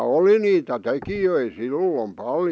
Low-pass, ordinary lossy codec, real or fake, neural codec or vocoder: none; none; real; none